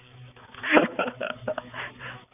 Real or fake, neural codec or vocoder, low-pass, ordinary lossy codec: fake; codec, 16 kHz, 4 kbps, X-Codec, HuBERT features, trained on balanced general audio; 3.6 kHz; none